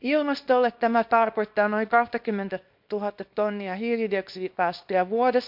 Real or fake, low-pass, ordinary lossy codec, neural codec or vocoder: fake; 5.4 kHz; MP3, 48 kbps; codec, 24 kHz, 0.9 kbps, WavTokenizer, small release